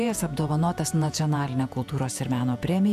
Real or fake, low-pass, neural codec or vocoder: fake; 14.4 kHz; vocoder, 48 kHz, 128 mel bands, Vocos